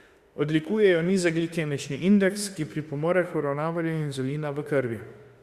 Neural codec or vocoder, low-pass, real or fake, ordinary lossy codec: autoencoder, 48 kHz, 32 numbers a frame, DAC-VAE, trained on Japanese speech; 14.4 kHz; fake; Opus, 64 kbps